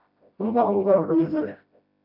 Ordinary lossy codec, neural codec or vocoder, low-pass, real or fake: AAC, 48 kbps; codec, 16 kHz, 0.5 kbps, FreqCodec, smaller model; 5.4 kHz; fake